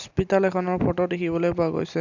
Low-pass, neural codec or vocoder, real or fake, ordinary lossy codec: 7.2 kHz; none; real; none